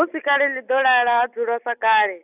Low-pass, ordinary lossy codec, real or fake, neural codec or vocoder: 3.6 kHz; none; real; none